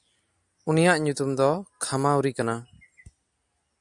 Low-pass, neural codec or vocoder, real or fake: 10.8 kHz; none; real